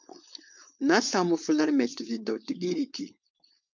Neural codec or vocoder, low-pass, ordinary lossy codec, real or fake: codec, 16 kHz, 4.8 kbps, FACodec; 7.2 kHz; MP3, 64 kbps; fake